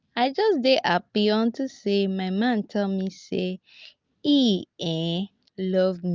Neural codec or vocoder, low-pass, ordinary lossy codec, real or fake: none; 7.2 kHz; Opus, 24 kbps; real